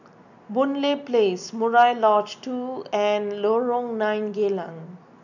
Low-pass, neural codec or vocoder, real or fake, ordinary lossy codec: 7.2 kHz; none; real; none